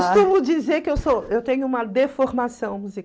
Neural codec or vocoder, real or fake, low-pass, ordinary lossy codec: none; real; none; none